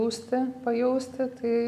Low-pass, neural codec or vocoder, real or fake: 14.4 kHz; none; real